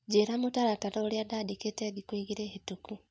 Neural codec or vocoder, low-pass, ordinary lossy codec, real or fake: none; none; none; real